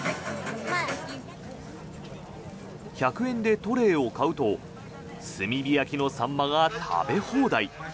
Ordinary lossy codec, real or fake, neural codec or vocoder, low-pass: none; real; none; none